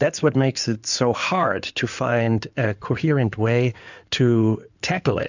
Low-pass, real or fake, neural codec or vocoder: 7.2 kHz; fake; codec, 16 kHz in and 24 kHz out, 2.2 kbps, FireRedTTS-2 codec